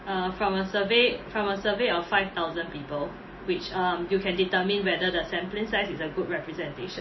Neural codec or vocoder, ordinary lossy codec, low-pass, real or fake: none; MP3, 24 kbps; 7.2 kHz; real